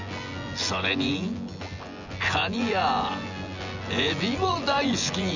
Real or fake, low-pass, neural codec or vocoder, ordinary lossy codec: fake; 7.2 kHz; vocoder, 24 kHz, 100 mel bands, Vocos; none